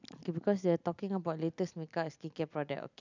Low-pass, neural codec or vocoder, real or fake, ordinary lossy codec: 7.2 kHz; none; real; none